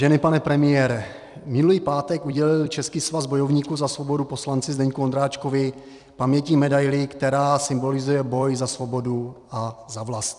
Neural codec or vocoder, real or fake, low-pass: vocoder, 44.1 kHz, 128 mel bands every 256 samples, BigVGAN v2; fake; 10.8 kHz